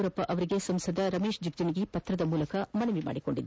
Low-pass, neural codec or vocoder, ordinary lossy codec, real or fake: none; none; none; real